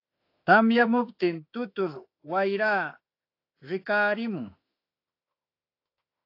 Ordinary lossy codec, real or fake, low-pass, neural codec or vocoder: AAC, 32 kbps; fake; 5.4 kHz; autoencoder, 48 kHz, 32 numbers a frame, DAC-VAE, trained on Japanese speech